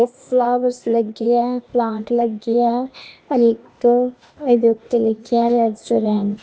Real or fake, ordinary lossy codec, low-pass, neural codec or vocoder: fake; none; none; codec, 16 kHz, 0.8 kbps, ZipCodec